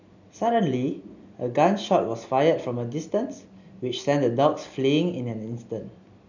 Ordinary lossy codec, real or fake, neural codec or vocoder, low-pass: none; real; none; 7.2 kHz